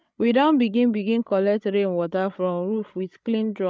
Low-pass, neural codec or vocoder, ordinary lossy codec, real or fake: none; codec, 16 kHz, 16 kbps, FreqCodec, larger model; none; fake